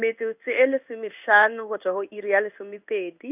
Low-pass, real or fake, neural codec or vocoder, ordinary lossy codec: 3.6 kHz; fake; codec, 16 kHz in and 24 kHz out, 1 kbps, XY-Tokenizer; none